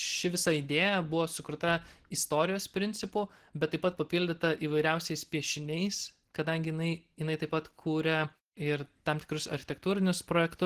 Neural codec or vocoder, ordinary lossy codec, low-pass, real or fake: none; Opus, 16 kbps; 14.4 kHz; real